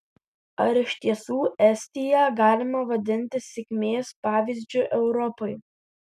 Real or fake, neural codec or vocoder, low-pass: real; none; 14.4 kHz